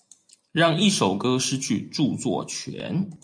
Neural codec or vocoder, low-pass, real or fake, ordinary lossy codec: none; 9.9 kHz; real; MP3, 64 kbps